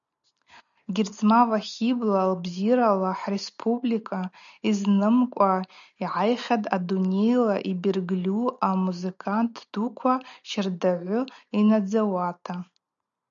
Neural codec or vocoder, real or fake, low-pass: none; real; 7.2 kHz